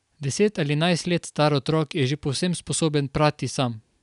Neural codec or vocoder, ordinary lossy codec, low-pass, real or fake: none; none; 10.8 kHz; real